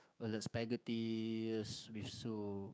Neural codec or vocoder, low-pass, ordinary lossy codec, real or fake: codec, 16 kHz, 6 kbps, DAC; none; none; fake